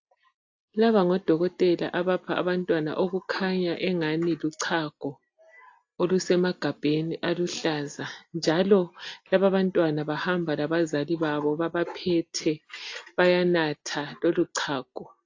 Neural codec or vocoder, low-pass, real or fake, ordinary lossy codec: none; 7.2 kHz; real; AAC, 48 kbps